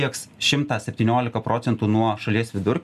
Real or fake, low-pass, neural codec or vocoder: real; 14.4 kHz; none